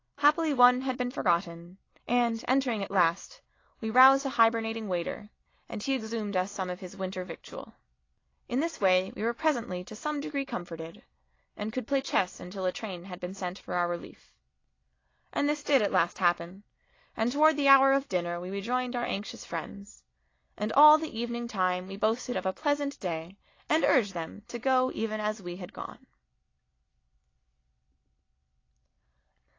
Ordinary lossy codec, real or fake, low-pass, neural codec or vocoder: AAC, 32 kbps; real; 7.2 kHz; none